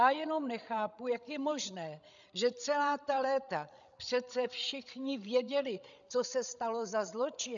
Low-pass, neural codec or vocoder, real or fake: 7.2 kHz; codec, 16 kHz, 16 kbps, FreqCodec, larger model; fake